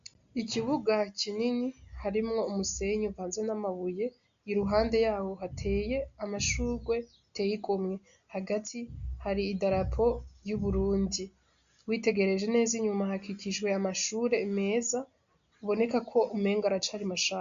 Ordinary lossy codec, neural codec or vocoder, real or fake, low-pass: AAC, 64 kbps; none; real; 7.2 kHz